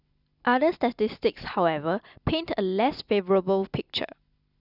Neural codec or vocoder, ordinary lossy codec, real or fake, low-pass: none; none; real; 5.4 kHz